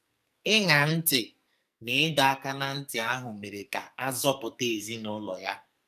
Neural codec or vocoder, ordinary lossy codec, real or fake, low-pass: codec, 44.1 kHz, 2.6 kbps, SNAC; none; fake; 14.4 kHz